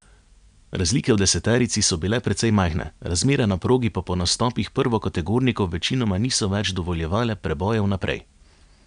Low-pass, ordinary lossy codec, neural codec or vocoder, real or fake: 9.9 kHz; none; none; real